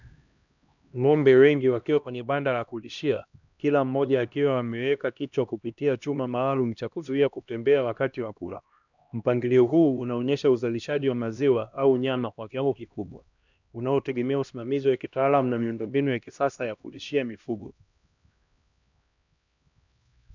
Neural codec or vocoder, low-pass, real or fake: codec, 16 kHz, 1 kbps, X-Codec, HuBERT features, trained on LibriSpeech; 7.2 kHz; fake